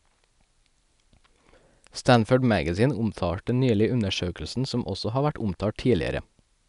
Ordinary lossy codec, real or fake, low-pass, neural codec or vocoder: none; real; 10.8 kHz; none